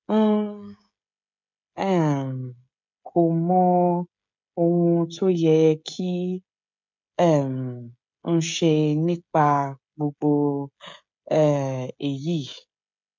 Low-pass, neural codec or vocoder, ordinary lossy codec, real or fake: 7.2 kHz; codec, 16 kHz, 16 kbps, FreqCodec, smaller model; MP3, 64 kbps; fake